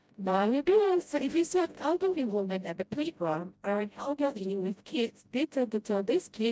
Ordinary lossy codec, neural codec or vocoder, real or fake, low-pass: none; codec, 16 kHz, 0.5 kbps, FreqCodec, smaller model; fake; none